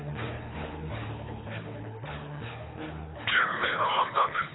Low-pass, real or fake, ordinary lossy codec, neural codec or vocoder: 7.2 kHz; fake; AAC, 16 kbps; codec, 24 kHz, 3 kbps, HILCodec